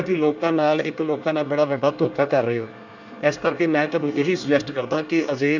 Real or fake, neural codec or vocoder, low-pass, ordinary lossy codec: fake; codec, 24 kHz, 1 kbps, SNAC; 7.2 kHz; none